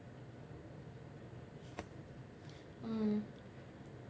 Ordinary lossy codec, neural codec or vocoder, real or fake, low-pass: none; none; real; none